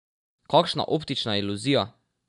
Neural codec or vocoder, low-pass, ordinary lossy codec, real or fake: none; 10.8 kHz; none; real